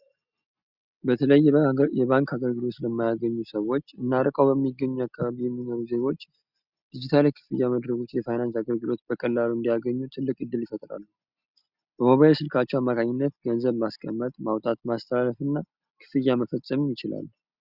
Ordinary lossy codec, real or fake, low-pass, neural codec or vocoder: Opus, 64 kbps; real; 5.4 kHz; none